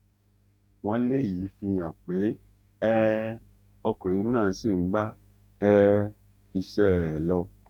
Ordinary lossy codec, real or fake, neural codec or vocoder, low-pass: none; fake; codec, 44.1 kHz, 2.6 kbps, DAC; 19.8 kHz